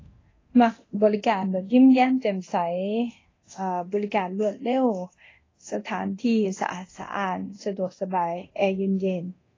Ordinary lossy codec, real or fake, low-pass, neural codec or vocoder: AAC, 32 kbps; fake; 7.2 kHz; codec, 24 kHz, 0.9 kbps, DualCodec